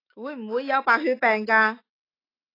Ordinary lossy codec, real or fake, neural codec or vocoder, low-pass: AAC, 24 kbps; fake; autoencoder, 48 kHz, 128 numbers a frame, DAC-VAE, trained on Japanese speech; 5.4 kHz